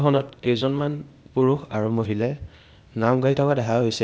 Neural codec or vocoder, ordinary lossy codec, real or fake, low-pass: codec, 16 kHz, 0.8 kbps, ZipCodec; none; fake; none